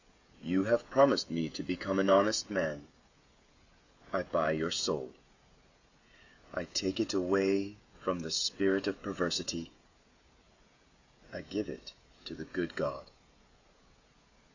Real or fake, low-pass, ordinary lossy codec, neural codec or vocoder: fake; 7.2 kHz; Opus, 64 kbps; codec, 16 kHz, 16 kbps, FreqCodec, smaller model